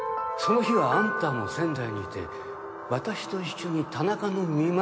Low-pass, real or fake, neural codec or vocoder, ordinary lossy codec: none; real; none; none